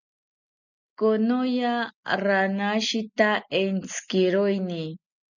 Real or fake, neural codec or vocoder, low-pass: real; none; 7.2 kHz